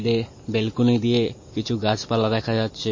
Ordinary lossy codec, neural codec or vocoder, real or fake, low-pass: MP3, 32 kbps; none; real; 7.2 kHz